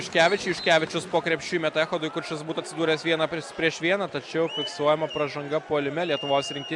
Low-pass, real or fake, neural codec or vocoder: 10.8 kHz; real; none